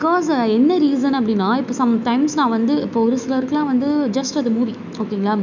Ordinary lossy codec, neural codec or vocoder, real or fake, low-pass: none; autoencoder, 48 kHz, 128 numbers a frame, DAC-VAE, trained on Japanese speech; fake; 7.2 kHz